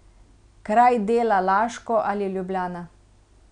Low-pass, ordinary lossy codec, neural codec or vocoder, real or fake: 9.9 kHz; none; none; real